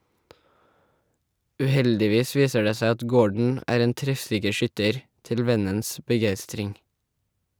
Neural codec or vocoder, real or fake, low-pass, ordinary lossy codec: none; real; none; none